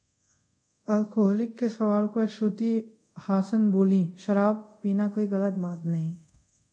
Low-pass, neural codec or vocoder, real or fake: 9.9 kHz; codec, 24 kHz, 0.5 kbps, DualCodec; fake